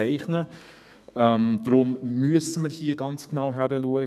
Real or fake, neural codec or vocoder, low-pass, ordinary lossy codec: fake; codec, 32 kHz, 1.9 kbps, SNAC; 14.4 kHz; none